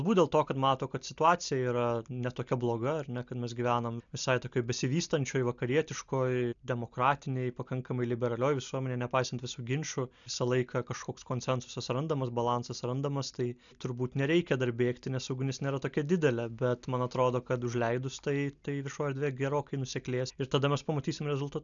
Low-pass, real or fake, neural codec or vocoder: 7.2 kHz; real; none